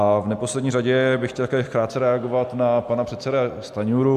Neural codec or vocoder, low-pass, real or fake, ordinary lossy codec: none; 14.4 kHz; real; MP3, 96 kbps